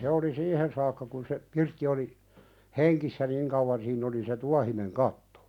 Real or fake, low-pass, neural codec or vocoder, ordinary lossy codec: real; 19.8 kHz; none; none